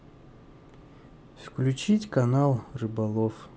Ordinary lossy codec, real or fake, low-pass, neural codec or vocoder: none; real; none; none